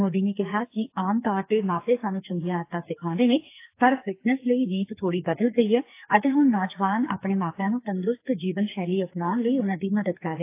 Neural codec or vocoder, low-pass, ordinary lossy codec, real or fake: codec, 44.1 kHz, 2.6 kbps, SNAC; 3.6 kHz; AAC, 24 kbps; fake